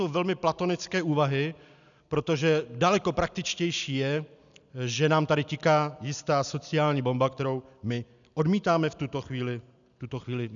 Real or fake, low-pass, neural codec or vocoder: real; 7.2 kHz; none